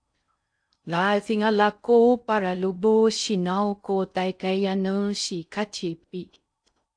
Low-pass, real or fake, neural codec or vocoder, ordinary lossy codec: 9.9 kHz; fake; codec, 16 kHz in and 24 kHz out, 0.6 kbps, FocalCodec, streaming, 4096 codes; AAC, 64 kbps